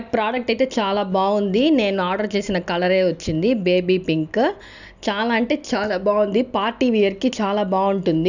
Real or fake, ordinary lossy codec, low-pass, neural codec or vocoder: real; none; 7.2 kHz; none